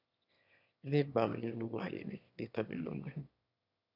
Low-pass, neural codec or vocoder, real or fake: 5.4 kHz; autoencoder, 22.05 kHz, a latent of 192 numbers a frame, VITS, trained on one speaker; fake